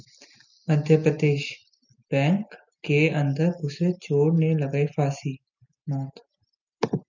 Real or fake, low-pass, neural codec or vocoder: real; 7.2 kHz; none